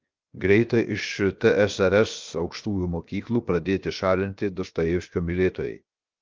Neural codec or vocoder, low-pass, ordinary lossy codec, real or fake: codec, 16 kHz, 0.7 kbps, FocalCodec; 7.2 kHz; Opus, 32 kbps; fake